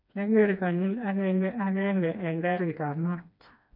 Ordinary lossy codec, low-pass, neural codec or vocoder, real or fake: none; 5.4 kHz; codec, 16 kHz, 2 kbps, FreqCodec, smaller model; fake